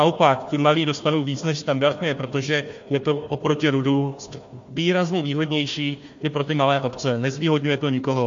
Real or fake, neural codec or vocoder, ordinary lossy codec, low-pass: fake; codec, 16 kHz, 1 kbps, FunCodec, trained on Chinese and English, 50 frames a second; MP3, 48 kbps; 7.2 kHz